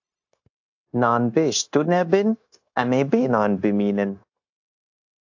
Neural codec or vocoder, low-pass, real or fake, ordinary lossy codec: codec, 16 kHz, 0.9 kbps, LongCat-Audio-Codec; 7.2 kHz; fake; AAC, 48 kbps